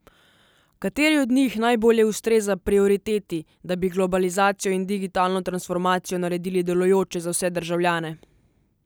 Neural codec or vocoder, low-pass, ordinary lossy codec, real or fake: none; none; none; real